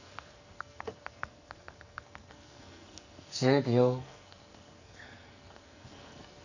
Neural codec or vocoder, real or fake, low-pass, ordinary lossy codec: codec, 44.1 kHz, 2.6 kbps, SNAC; fake; 7.2 kHz; none